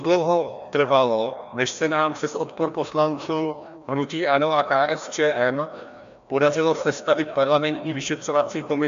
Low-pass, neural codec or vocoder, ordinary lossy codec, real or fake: 7.2 kHz; codec, 16 kHz, 1 kbps, FreqCodec, larger model; MP3, 64 kbps; fake